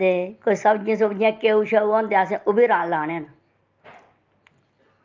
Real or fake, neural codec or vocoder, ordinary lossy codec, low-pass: real; none; Opus, 24 kbps; 7.2 kHz